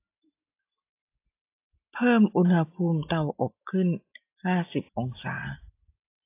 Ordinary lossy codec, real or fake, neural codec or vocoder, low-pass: AAC, 24 kbps; real; none; 3.6 kHz